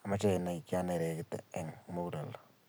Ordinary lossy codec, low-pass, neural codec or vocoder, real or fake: none; none; none; real